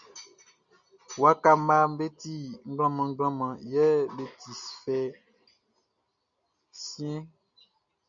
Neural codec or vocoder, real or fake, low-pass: none; real; 7.2 kHz